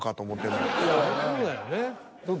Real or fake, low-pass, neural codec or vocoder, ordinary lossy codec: real; none; none; none